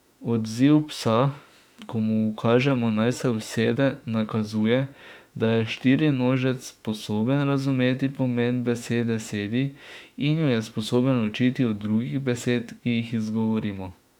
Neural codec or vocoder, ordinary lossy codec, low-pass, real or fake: autoencoder, 48 kHz, 32 numbers a frame, DAC-VAE, trained on Japanese speech; none; 19.8 kHz; fake